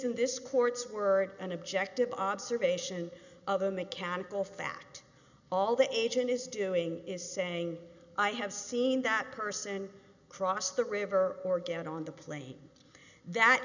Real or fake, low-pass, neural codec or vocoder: real; 7.2 kHz; none